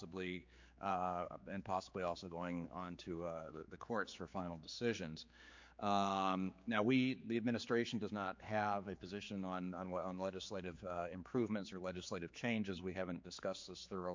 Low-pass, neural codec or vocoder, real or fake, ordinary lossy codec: 7.2 kHz; codec, 16 kHz, 4 kbps, X-Codec, HuBERT features, trained on general audio; fake; MP3, 48 kbps